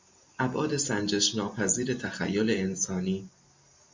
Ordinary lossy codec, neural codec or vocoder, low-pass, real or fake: MP3, 64 kbps; none; 7.2 kHz; real